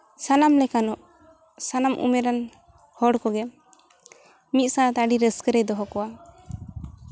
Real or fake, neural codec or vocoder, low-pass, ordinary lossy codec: real; none; none; none